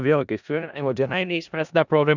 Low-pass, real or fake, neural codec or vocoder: 7.2 kHz; fake; codec, 16 kHz in and 24 kHz out, 0.4 kbps, LongCat-Audio-Codec, four codebook decoder